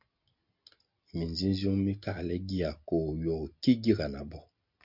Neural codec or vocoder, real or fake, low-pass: none; real; 5.4 kHz